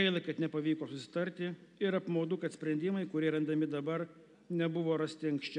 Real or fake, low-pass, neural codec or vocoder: real; 10.8 kHz; none